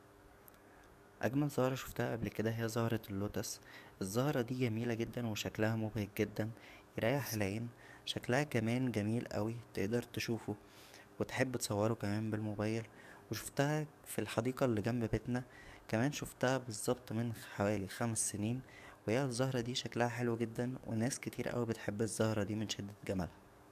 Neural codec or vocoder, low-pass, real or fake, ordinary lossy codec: codec, 44.1 kHz, 7.8 kbps, DAC; 14.4 kHz; fake; none